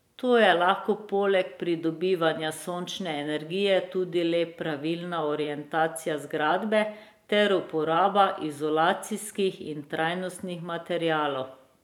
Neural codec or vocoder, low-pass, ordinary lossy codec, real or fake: vocoder, 44.1 kHz, 128 mel bands every 512 samples, BigVGAN v2; 19.8 kHz; none; fake